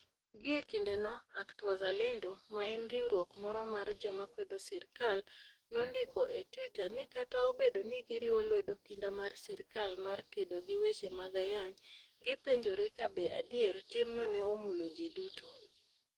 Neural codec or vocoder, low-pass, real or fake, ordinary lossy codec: codec, 44.1 kHz, 2.6 kbps, DAC; 19.8 kHz; fake; Opus, 24 kbps